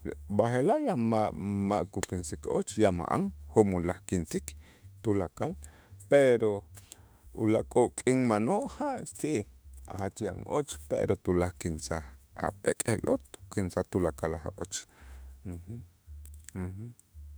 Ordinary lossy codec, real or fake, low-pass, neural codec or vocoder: none; fake; none; autoencoder, 48 kHz, 32 numbers a frame, DAC-VAE, trained on Japanese speech